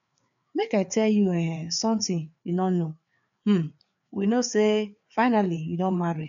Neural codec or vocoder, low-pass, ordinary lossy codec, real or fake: codec, 16 kHz, 4 kbps, FreqCodec, larger model; 7.2 kHz; none; fake